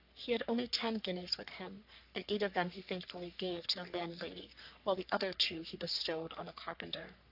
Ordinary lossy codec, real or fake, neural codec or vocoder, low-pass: AAC, 48 kbps; fake; codec, 44.1 kHz, 3.4 kbps, Pupu-Codec; 5.4 kHz